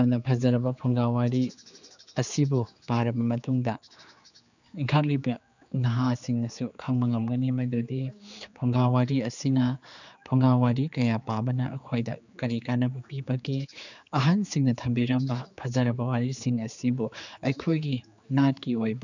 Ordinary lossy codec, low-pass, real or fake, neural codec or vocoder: none; 7.2 kHz; fake; codec, 16 kHz, 4 kbps, X-Codec, HuBERT features, trained on general audio